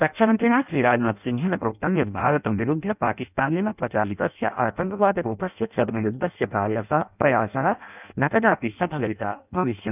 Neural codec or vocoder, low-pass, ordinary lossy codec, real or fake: codec, 16 kHz in and 24 kHz out, 0.6 kbps, FireRedTTS-2 codec; 3.6 kHz; none; fake